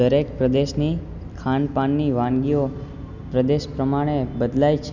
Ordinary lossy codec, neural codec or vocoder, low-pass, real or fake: none; none; 7.2 kHz; real